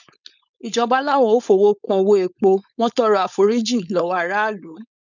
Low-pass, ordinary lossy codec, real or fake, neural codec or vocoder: 7.2 kHz; none; fake; codec, 16 kHz, 4.8 kbps, FACodec